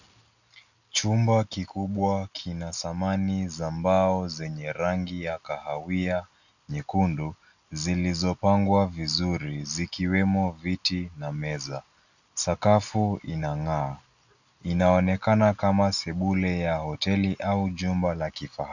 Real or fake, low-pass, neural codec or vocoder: real; 7.2 kHz; none